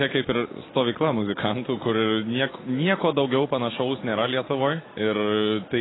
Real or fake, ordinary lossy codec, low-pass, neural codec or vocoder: real; AAC, 16 kbps; 7.2 kHz; none